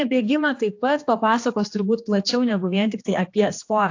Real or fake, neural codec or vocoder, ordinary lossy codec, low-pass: fake; codec, 16 kHz, 2 kbps, X-Codec, HuBERT features, trained on general audio; AAC, 48 kbps; 7.2 kHz